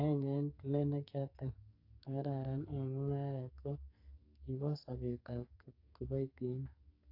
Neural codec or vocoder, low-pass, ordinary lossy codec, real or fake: codec, 44.1 kHz, 2.6 kbps, SNAC; 5.4 kHz; AAC, 32 kbps; fake